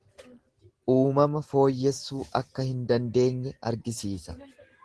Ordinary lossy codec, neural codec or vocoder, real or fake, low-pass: Opus, 16 kbps; none; real; 10.8 kHz